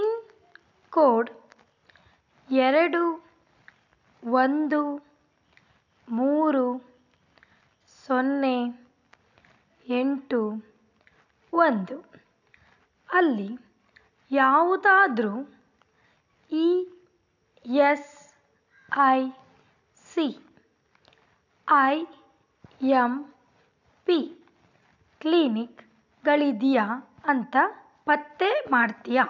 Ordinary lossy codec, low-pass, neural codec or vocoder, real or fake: none; 7.2 kHz; none; real